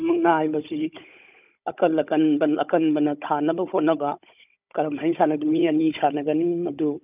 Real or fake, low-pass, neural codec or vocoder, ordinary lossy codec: fake; 3.6 kHz; codec, 16 kHz, 16 kbps, FunCodec, trained on Chinese and English, 50 frames a second; none